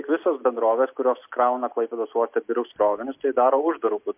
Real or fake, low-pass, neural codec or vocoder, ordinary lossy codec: real; 3.6 kHz; none; MP3, 32 kbps